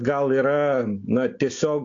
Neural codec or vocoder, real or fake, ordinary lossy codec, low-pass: none; real; AAC, 48 kbps; 7.2 kHz